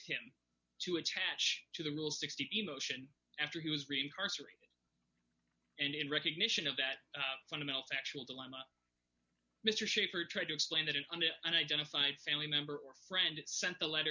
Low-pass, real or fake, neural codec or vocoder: 7.2 kHz; real; none